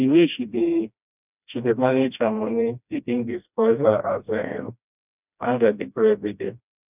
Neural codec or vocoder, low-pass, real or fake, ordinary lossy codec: codec, 16 kHz, 1 kbps, FreqCodec, smaller model; 3.6 kHz; fake; none